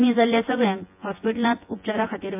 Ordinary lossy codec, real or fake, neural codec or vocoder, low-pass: MP3, 32 kbps; fake; vocoder, 24 kHz, 100 mel bands, Vocos; 3.6 kHz